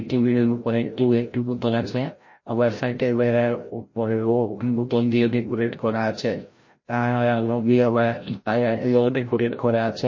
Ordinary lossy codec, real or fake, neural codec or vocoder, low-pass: MP3, 32 kbps; fake; codec, 16 kHz, 0.5 kbps, FreqCodec, larger model; 7.2 kHz